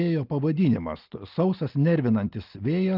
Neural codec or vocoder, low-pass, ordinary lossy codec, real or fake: none; 5.4 kHz; Opus, 32 kbps; real